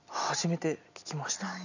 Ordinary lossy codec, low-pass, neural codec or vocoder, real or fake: none; 7.2 kHz; none; real